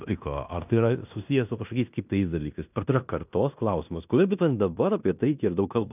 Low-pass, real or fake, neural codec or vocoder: 3.6 kHz; fake; codec, 16 kHz in and 24 kHz out, 0.9 kbps, LongCat-Audio-Codec, fine tuned four codebook decoder